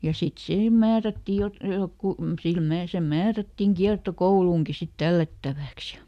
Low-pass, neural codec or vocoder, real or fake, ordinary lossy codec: 14.4 kHz; none; real; none